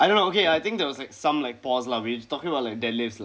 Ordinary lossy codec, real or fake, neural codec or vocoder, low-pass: none; real; none; none